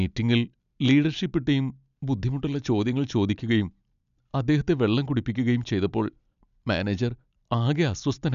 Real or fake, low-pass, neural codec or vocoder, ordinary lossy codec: real; 7.2 kHz; none; none